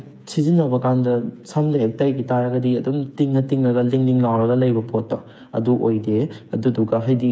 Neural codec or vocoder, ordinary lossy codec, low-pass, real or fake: codec, 16 kHz, 8 kbps, FreqCodec, smaller model; none; none; fake